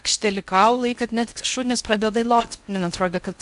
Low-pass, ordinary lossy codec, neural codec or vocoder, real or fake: 10.8 kHz; AAC, 96 kbps; codec, 16 kHz in and 24 kHz out, 0.6 kbps, FocalCodec, streaming, 4096 codes; fake